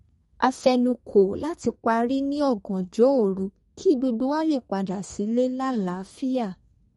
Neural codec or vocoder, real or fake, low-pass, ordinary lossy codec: codec, 32 kHz, 1.9 kbps, SNAC; fake; 14.4 kHz; MP3, 48 kbps